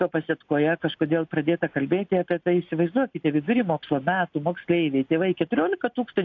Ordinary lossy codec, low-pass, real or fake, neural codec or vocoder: AAC, 48 kbps; 7.2 kHz; real; none